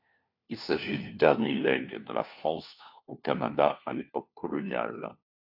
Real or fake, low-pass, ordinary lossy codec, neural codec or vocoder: fake; 5.4 kHz; AAC, 48 kbps; codec, 16 kHz, 1 kbps, FunCodec, trained on LibriTTS, 50 frames a second